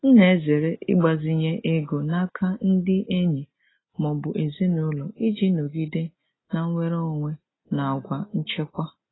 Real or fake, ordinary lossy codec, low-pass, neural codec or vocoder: real; AAC, 16 kbps; 7.2 kHz; none